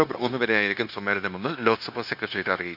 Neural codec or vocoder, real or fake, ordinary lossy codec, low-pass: codec, 16 kHz, 0.9 kbps, LongCat-Audio-Codec; fake; none; 5.4 kHz